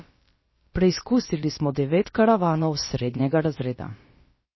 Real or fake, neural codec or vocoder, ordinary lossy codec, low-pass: fake; codec, 16 kHz, about 1 kbps, DyCAST, with the encoder's durations; MP3, 24 kbps; 7.2 kHz